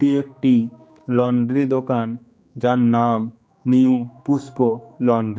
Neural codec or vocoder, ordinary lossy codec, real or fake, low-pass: codec, 16 kHz, 2 kbps, X-Codec, HuBERT features, trained on general audio; none; fake; none